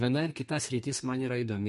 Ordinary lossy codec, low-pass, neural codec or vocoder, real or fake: MP3, 48 kbps; 14.4 kHz; codec, 32 kHz, 1.9 kbps, SNAC; fake